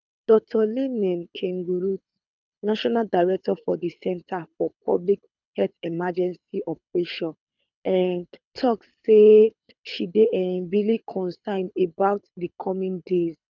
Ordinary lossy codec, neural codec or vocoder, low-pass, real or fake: none; codec, 24 kHz, 6 kbps, HILCodec; 7.2 kHz; fake